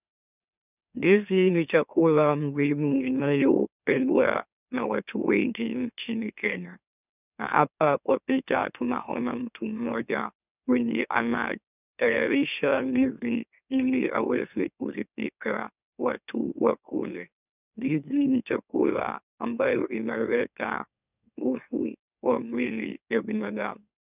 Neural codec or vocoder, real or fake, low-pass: autoencoder, 44.1 kHz, a latent of 192 numbers a frame, MeloTTS; fake; 3.6 kHz